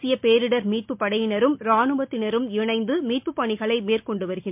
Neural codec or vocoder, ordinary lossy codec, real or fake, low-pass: none; MP3, 32 kbps; real; 3.6 kHz